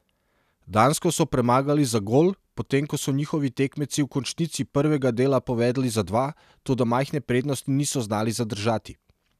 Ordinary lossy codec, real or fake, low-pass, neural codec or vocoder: none; real; 14.4 kHz; none